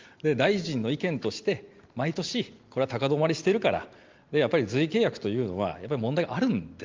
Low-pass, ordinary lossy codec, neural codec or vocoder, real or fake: 7.2 kHz; Opus, 32 kbps; none; real